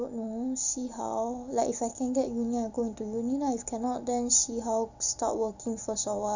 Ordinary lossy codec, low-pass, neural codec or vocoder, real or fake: none; 7.2 kHz; none; real